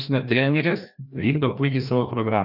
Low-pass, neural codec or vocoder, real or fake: 5.4 kHz; codec, 16 kHz, 1 kbps, FreqCodec, larger model; fake